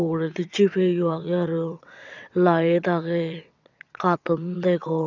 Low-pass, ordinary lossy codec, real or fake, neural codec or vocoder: 7.2 kHz; none; fake; vocoder, 22.05 kHz, 80 mel bands, WaveNeXt